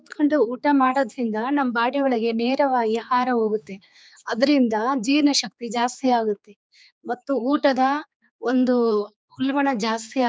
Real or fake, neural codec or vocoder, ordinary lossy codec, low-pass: fake; codec, 16 kHz, 4 kbps, X-Codec, HuBERT features, trained on general audio; none; none